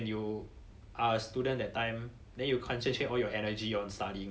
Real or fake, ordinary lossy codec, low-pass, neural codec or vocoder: real; none; none; none